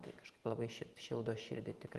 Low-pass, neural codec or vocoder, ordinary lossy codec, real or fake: 14.4 kHz; vocoder, 44.1 kHz, 128 mel bands every 512 samples, BigVGAN v2; Opus, 24 kbps; fake